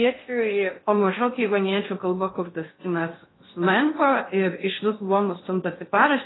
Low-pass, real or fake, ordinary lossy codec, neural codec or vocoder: 7.2 kHz; fake; AAC, 16 kbps; codec, 16 kHz in and 24 kHz out, 0.6 kbps, FocalCodec, streaming, 2048 codes